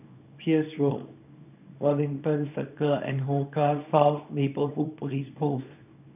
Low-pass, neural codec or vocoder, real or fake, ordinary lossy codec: 3.6 kHz; codec, 24 kHz, 0.9 kbps, WavTokenizer, small release; fake; none